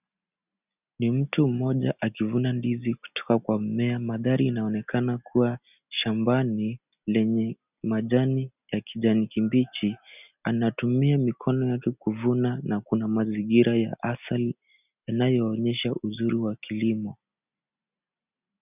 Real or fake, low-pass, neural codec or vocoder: real; 3.6 kHz; none